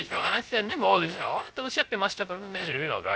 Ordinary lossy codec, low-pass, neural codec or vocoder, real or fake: none; none; codec, 16 kHz, 0.3 kbps, FocalCodec; fake